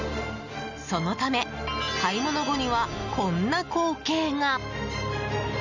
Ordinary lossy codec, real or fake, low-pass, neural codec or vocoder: none; real; 7.2 kHz; none